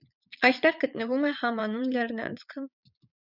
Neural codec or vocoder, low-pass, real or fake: vocoder, 22.05 kHz, 80 mel bands, Vocos; 5.4 kHz; fake